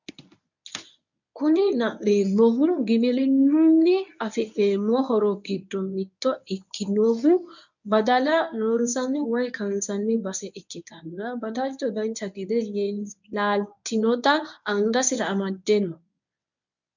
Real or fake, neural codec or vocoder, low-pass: fake; codec, 24 kHz, 0.9 kbps, WavTokenizer, medium speech release version 1; 7.2 kHz